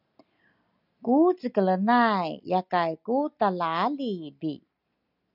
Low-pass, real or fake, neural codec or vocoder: 5.4 kHz; real; none